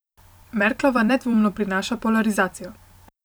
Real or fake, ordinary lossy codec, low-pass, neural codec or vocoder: fake; none; none; vocoder, 44.1 kHz, 128 mel bands every 256 samples, BigVGAN v2